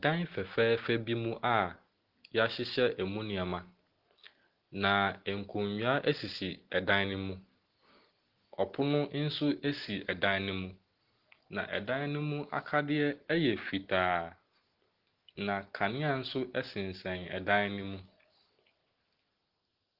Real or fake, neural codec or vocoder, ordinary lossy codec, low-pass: real; none; Opus, 16 kbps; 5.4 kHz